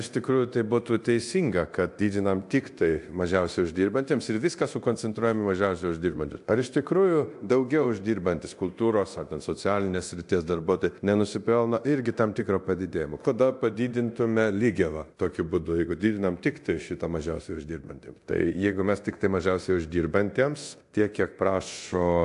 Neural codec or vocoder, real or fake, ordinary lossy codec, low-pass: codec, 24 kHz, 0.9 kbps, DualCodec; fake; AAC, 64 kbps; 10.8 kHz